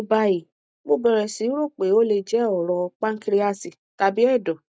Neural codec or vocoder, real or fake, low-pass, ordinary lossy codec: none; real; none; none